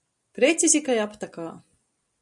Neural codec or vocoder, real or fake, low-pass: none; real; 10.8 kHz